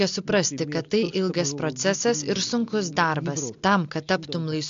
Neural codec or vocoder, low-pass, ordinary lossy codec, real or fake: none; 7.2 kHz; AAC, 64 kbps; real